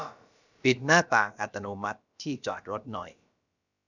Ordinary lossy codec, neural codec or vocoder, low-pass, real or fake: none; codec, 16 kHz, about 1 kbps, DyCAST, with the encoder's durations; 7.2 kHz; fake